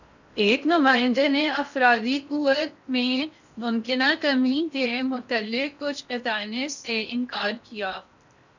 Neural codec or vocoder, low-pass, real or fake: codec, 16 kHz in and 24 kHz out, 0.6 kbps, FocalCodec, streaming, 4096 codes; 7.2 kHz; fake